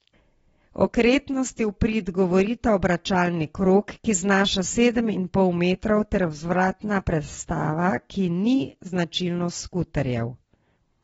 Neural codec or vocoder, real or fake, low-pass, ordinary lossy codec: none; real; 19.8 kHz; AAC, 24 kbps